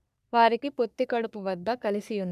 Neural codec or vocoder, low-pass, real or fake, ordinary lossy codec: codec, 44.1 kHz, 3.4 kbps, Pupu-Codec; 14.4 kHz; fake; none